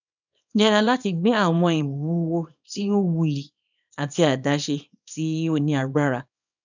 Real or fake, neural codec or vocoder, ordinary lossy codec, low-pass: fake; codec, 24 kHz, 0.9 kbps, WavTokenizer, small release; none; 7.2 kHz